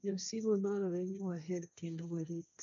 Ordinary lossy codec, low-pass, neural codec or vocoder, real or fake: none; 7.2 kHz; codec, 16 kHz, 1.1 kbps, Voila-Tokenizer; fake